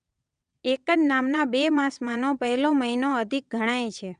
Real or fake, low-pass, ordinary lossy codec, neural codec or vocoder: fake; 9.9 kHz; none; vocoder, 22.05 kHz, 80 mel bands, WaveNeXt